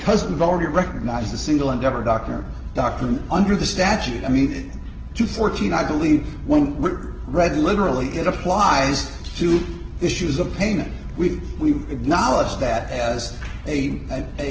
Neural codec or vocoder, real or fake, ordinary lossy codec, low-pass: none; real; Opus, 16 kbps; 7.2 kHz